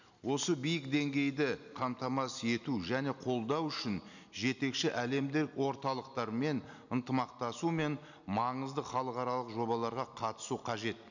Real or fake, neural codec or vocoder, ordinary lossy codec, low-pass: real; none; none; 7.2 kHz